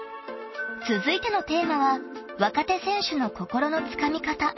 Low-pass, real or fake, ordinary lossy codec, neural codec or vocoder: 7.2 kHz; real; MP3, 24 kbps; none